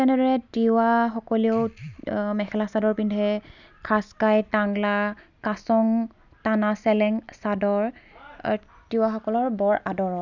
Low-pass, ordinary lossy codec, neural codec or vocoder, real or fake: 7.2 kHz; none; none; real